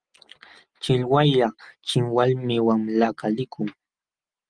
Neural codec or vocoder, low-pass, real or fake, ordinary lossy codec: none; 9.9 kHz; real; Opus, 24 kbps